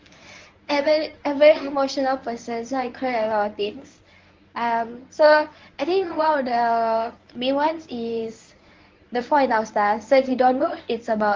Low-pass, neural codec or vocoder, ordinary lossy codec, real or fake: 7.2 kHz; codec, 24 kHz, 0.9 kbps, WavTokenizer, medium speech release version 1; Opus, 32 kbps; fake